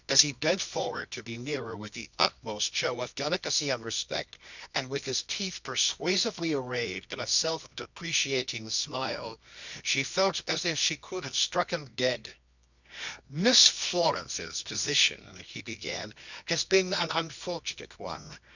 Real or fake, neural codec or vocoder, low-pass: fake; codec, 24 kHz, 0.9 kbps, WavTokenizer, medium music audio release; 7.2 kHz